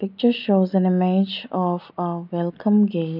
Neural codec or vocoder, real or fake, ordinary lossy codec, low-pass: none; real; none; 5.4 kHz